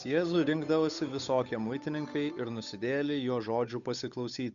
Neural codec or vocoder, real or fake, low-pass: codec, 16 kHz, 8 kbps, FunCodec, trained on Chinese and English, 25 frames a second; fake; 7.2 kHz